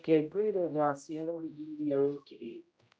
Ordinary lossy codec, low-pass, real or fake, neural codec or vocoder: none; none; fake; codec, 16 kHz, 0.5 kbps, X-Codec, HuBERT features, trained on general audio